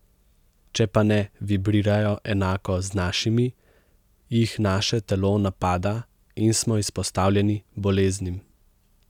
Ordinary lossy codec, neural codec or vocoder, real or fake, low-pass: none; none; real; 19.8 kHz